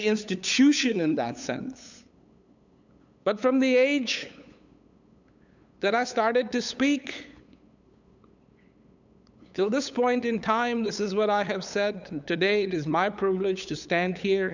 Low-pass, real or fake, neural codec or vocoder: 7.2 kHz; fake; codec, 16 kHz, 8 kbps, FunCodec, trained on LibriTTS, 25 frames a second